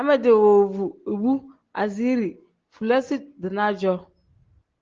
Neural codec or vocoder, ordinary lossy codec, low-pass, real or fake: none; Opus, 16 kbps; 7.2 kHz; real